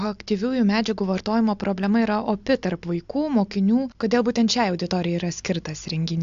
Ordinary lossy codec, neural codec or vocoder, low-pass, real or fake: AAC, 64 kbps; none; 7.2 kHz; real